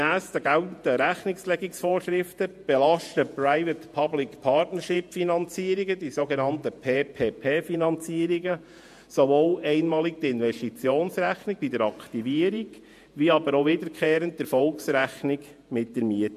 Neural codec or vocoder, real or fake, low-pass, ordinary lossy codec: vocoder, 48 kHz, 128 mel bands, Vocos; fake; 14.4 kHz; MP3, 64 kbps